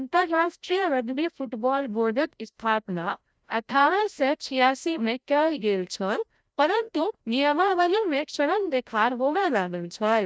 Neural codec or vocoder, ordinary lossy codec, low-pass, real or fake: codec, 16 kHz, 0.5 kbps, FreqCodec, larger model; none; none; fake